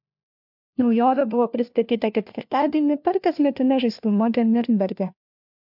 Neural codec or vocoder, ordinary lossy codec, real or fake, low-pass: codec, 16 kHz, 1 kbps, FunCodec, trained on LibriTTS, 50 frames a second; MP3, 48 kbps; fake; 5.4 kHz